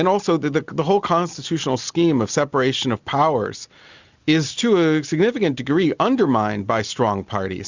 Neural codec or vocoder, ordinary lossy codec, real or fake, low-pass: none; Opus, 64 kbps; real; 7.2 kHz